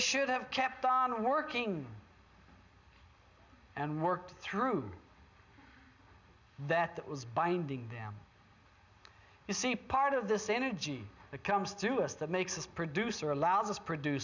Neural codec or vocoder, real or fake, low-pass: none; real; 7.2 kHz